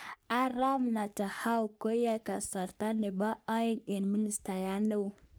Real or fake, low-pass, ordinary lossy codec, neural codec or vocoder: fake; none; none; codec, 44.1 kHz, 3.4 kbps, Pupu-Codec